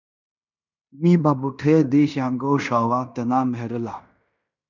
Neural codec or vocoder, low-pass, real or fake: codec, 16 kHz in and 24 kHz out, 0.9 kbps, LongCat-Audio-Codec, fine tuned four codebook decoder; 7.2 kHz; fake